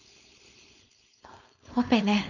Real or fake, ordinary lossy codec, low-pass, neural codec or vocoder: fake; none; 7.2 kHz; codec, 16 kHz, 4.8 kbps, FACodec